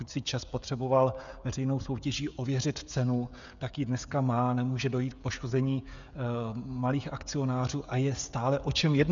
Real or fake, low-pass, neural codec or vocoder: fake; 7.2 kHz; codec, 16 kHz, 16 kbps, FreqCodec, smaller model